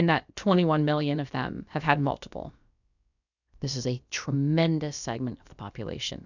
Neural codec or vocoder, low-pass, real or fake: codec, 16 kHz, about 1 kbps, DyCAST, with the encoder's durations; 7.2 kHz; fake